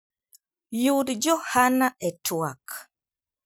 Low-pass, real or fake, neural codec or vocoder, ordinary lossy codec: none; real; none; none